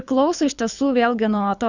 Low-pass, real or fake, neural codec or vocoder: 7.2 kHz; fake; codec, 24 kHz, 6 kbps, HILCodec